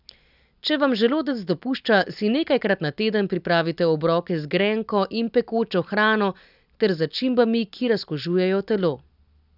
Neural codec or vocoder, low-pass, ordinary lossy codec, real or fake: none; 5.4 kHz; none; real